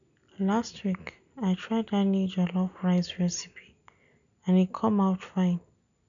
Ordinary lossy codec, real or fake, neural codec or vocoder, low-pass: none; real; none; 7.2 kHz